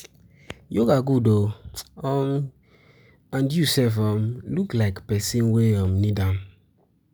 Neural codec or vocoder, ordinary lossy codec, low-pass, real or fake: none; none; none; real